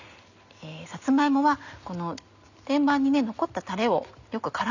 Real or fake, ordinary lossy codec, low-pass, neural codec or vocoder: real; none; 7.2 kHz; none